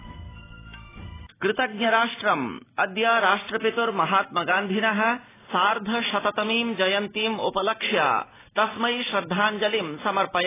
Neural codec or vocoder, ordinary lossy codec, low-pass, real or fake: none; AAC, 16 kbps; 3.6 kHz; real